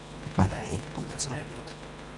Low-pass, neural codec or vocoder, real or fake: 10.8 kHz; codec, 24 kHz, 1.5 kbps, HILCodec; fake